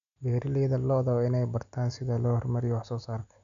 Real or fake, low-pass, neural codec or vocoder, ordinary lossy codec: real; 7.2 kHz; none; none